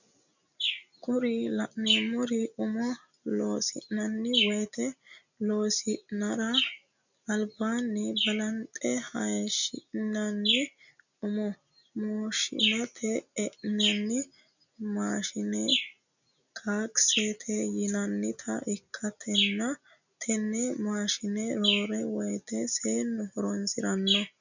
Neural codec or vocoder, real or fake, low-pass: none; real; 7.2 kHz